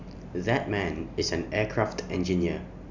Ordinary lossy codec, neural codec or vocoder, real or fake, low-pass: none; none; real; 7.2 kHz